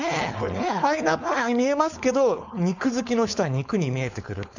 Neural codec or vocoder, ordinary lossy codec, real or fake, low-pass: codec, 16 kHz, 4.8 kbps, FACodec; none; fake; 7.2 kHz